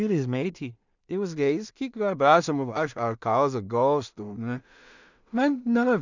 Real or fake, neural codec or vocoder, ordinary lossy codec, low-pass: fake; codec, 16 kHz in and 24 kHz out, 0.4 kbps, LongCat-Audio-Codec, two codebook decoder; none; 7.2 kHz